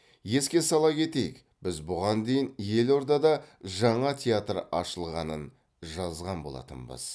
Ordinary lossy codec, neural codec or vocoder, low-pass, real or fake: none; none; none; real